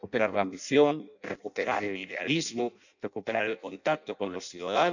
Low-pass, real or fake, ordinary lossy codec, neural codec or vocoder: 7.2 kHz; fake; none; codec, 16 kHz in and 24 kHz out, 0.6 kbps, FireRedTTS-2 codec